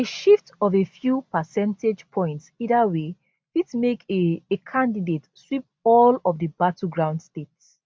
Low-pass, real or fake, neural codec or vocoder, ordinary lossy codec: none; real; none; none